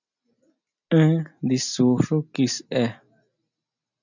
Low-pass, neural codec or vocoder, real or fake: 7.2 kHz; none; real